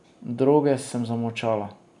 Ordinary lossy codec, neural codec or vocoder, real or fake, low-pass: none; none; real; 10.8 kHz